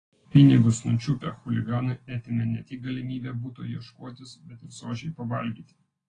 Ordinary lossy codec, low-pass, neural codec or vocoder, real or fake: AAC, 32 kbps; 9.9 kHz; none; real